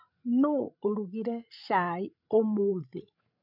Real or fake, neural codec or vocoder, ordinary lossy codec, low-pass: fake; codec, 16 kHz, 16 kbps, FreqCodec, larger model; none; 5.4 kHz